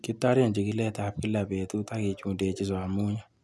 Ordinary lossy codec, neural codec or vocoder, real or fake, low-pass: none; none; real; none